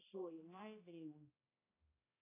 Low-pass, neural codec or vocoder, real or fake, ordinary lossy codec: 3.6 kHz; codec, 16 kHz, 1 kbps, X-Codec, HuBERT features, trained on balanced general audio; fake; AAC, 16 kbps